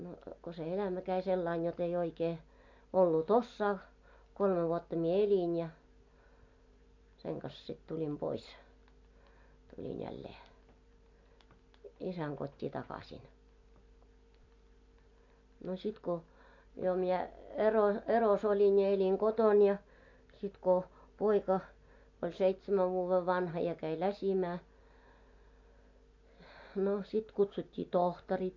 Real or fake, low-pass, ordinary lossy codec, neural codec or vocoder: real; 7.2 kHz; MP3, 48 kbps; none